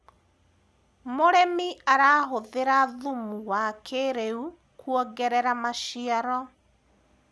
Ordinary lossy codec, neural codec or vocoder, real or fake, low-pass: none; none; real; none